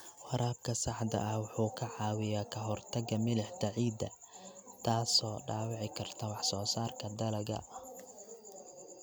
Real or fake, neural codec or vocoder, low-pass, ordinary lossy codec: real; none; none; none